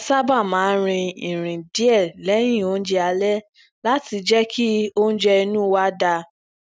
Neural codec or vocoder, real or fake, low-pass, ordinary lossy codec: none; real; none; none